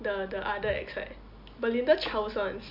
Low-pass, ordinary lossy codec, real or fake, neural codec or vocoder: 5.4 kHz; MP3, 48 kbps; real; none